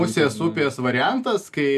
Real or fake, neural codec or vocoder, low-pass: real; none; 14.4 kHz